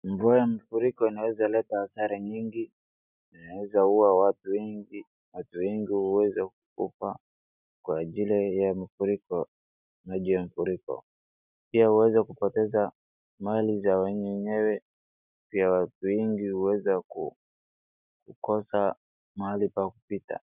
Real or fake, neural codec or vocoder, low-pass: real; none; 3.6 kHz